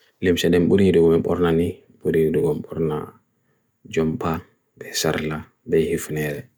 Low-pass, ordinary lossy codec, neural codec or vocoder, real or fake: none; none; none; real